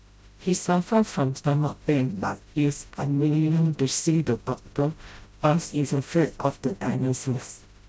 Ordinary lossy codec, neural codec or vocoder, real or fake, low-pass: none; codec, 16 kHz, 0.5 kbps, FreqCodec, smaller model; fake; none